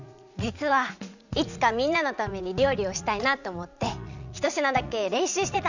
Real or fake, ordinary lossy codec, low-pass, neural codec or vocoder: fake; none; 7.2 kHz; vocoder, 44.1 kHz, 80 mel bands, Vocos